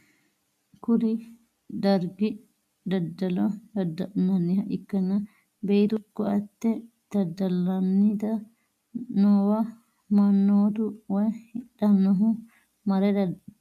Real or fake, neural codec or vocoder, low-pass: real; none; 14.4 kHz